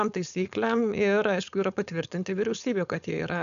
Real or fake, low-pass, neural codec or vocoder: fake; 7.2 kHz; codec, 16 kHz, 4.8 kbps, FACodec